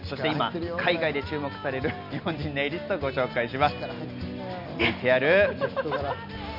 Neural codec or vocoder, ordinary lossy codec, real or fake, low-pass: none; none; real; 5.4 kHz